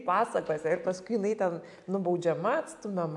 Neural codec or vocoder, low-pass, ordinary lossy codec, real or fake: none; 10.8 kHz; AAC, 64 kbps; real